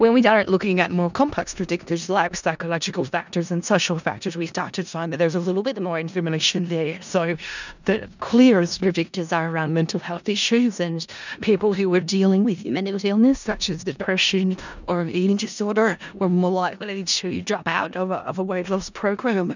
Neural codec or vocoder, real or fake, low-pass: codec, 16 kHz in and 24 kHz out, 0.4 kbps, LongCat-Audio-Codec, four codebook decoder; fake; 7.2 kHz